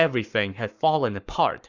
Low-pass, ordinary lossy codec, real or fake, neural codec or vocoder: 7.2 kHz; Opus, 64 kbps; fake; codec, 44.1 kHz, 7.8 kbps, Pupu-Codec